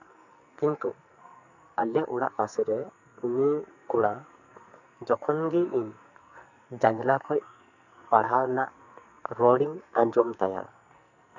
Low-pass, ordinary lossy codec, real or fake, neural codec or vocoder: 7.2 kHz; none; fake; codec, 44.1 kHz, 2.6 kbps, SNAC